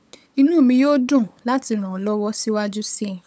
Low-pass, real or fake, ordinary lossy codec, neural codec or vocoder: none; fake; none; codec, 16 kHz, 8 kbps, FunCodec, trained on LibriTTS, 25 frames a second